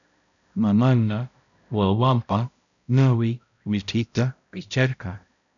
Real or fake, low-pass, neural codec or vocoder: fake; 7.2 kHz; codec, 16 kHz, 0.5 kbps, X-Codec, HuBERT features, trained on balanced general audio